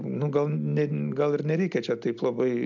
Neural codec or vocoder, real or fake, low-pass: none; real; 7.2 kHz